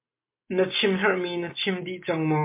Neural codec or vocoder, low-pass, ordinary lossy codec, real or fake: none; 3.6 kHz; MP3, 24 kbps; real